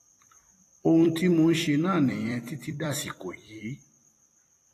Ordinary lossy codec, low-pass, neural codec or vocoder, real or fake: AAC, 48 kbps; 14.4 kHz; vocoder, 44.1 kHz, 128 mel bands every 256 samples, BigVGAN v2; fake